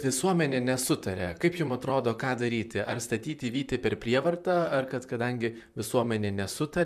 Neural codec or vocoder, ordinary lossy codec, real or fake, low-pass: vocoder, 44.1 kHz, 128 mel bands, Pupu-Vocoder; MP3, 96 kbps; fake; 14.4 kHz